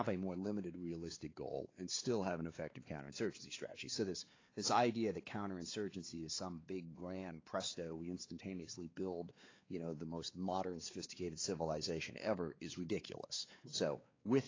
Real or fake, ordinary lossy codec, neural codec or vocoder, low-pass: fake; AAC, 32 kbps; codec, 16 kHz, 4 kbps, X-Codec, WavLM features, trained on Multilingual LibriSpeech; 7.2 kHz